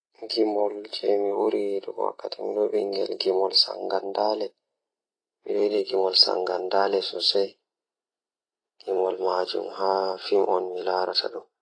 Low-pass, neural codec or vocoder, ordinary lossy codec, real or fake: 9.9 kHz; none; AAC, 32 kbps; real